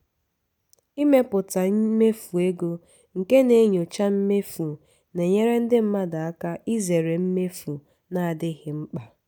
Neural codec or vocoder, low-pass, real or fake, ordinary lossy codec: none; none; real; none